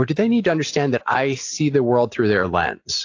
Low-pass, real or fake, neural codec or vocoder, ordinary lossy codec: 7.2 kHz; real; none; AAC, 48 kbps